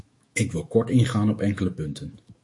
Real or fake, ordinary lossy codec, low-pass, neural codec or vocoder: fake; MP3, 48 kbps; 10.8 kHz; autoencoder, 48 kHz, 128 numbers a frame, DAC-VAE, trained on Japanese speech